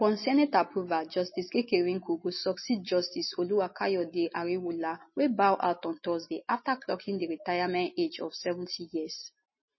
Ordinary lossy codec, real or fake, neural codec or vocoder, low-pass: MP3, 24 kbps; real; none; 7.2 kHz